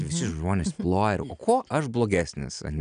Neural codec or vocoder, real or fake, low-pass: none; real; 9.9 kHz